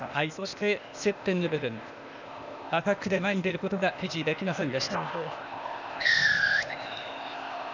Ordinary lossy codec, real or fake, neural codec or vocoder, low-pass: none; fake; codec, 16 kHz, 0.8 kbps, ZipCodec; 7.2 kHz